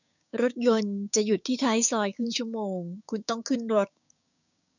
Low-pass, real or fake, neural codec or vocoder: 7.2 kHz; fake; codec, 16 kHz, 6 kbps, DAC